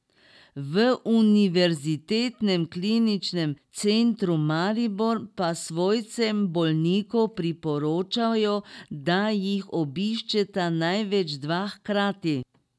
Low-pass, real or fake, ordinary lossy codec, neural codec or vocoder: none; real; none; none